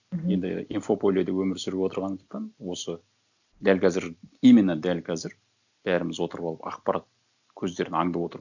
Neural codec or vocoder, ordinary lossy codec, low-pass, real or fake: none; none; 7.2 kHz; real